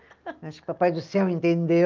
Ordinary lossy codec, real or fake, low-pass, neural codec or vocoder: Opus, 24 kbps; real; 7.2 kHz; none